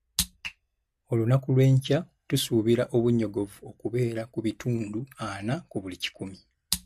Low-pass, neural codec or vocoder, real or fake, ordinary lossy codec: 14.4 kHz; none; real; MP3, 64 kbps